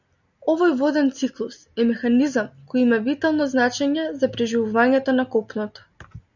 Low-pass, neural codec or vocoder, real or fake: 7.2 kHz; none; real